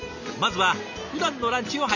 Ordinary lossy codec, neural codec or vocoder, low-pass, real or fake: none; none; 7.2 kHz; real